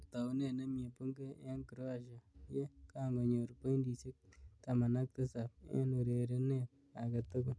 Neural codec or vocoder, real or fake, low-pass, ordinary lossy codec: none; real; none; none